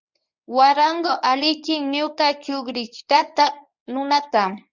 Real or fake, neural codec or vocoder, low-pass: fake; codec, 24 kHz, 0.9 kbps, WavTokenizer, medium speech release version 1; 7.2 kHz